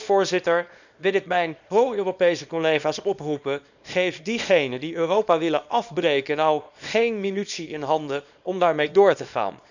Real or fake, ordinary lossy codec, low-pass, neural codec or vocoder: fake; none; 7.2 kHz; codec, 24 kHz, 0.9 kbps, WavTokenizer, small release